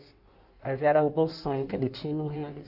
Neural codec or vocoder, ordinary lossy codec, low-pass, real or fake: codec, 16 kHz in and 24 kHz out, 1.1 kbps, FireRedTTS-2 codec; none; 5.4 kHz; fake